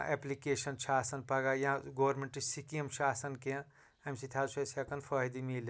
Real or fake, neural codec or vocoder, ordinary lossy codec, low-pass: real; none; none; none